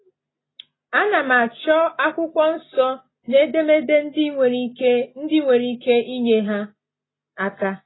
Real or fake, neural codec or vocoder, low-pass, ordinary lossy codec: real; none; 7.2 kHz; AAC, 16 kbps